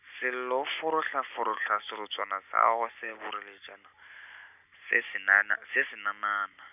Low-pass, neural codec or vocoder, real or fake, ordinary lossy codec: 3.6 kHz; none; real; none